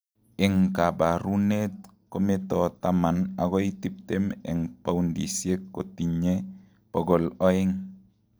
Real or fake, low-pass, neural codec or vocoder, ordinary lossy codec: real; none; none; none